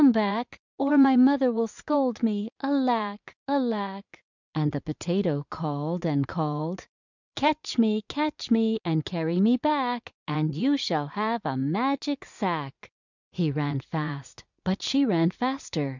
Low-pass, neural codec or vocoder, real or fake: 7.2 kHz; vocoder, 44.1 kHz, 80 mel bands, Vocos; fake